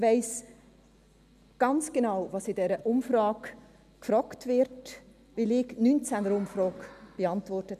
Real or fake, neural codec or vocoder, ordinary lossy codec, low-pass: real; none; none; 14.4 kHz